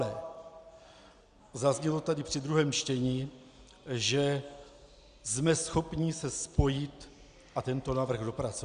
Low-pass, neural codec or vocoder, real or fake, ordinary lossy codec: 9.9 kHz; none; real; Opus, 64 kbps